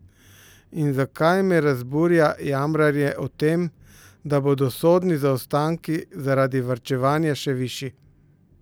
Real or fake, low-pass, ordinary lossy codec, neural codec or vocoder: real; none; none; none